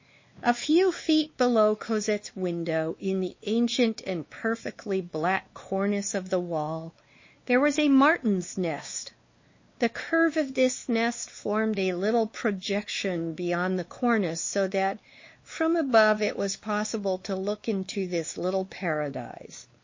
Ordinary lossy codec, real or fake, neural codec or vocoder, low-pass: MP3, 32 kbps; fake; codec, 16 kHz, 2 kbps, X-Codec, WavLM features, trained on Multilingual LibriSpeech; 7.2 kHz